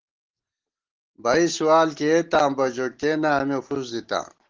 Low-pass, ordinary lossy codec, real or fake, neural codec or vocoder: 7.2 kHz; Opus, 16 kbps; real; none